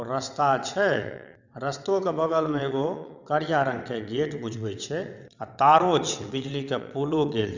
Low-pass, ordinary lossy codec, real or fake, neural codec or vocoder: 7.2 kHz; none; fake; vocoder, 22.05 kHz, 80 mel bands, WaveNeXt